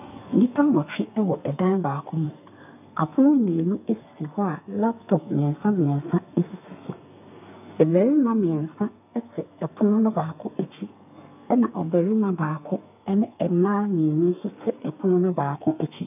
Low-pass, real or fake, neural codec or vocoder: 3.6 kHz; fake; codec, 32 kHz, 1.9 kbps, SNAC